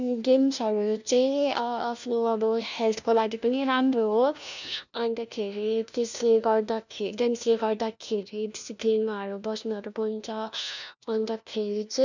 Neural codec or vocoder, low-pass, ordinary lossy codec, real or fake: codec, 16 kHz, 1 kbps, FunCodec, trained on LibriTTS, 50 frames a second; 7.2 kHz; none; fake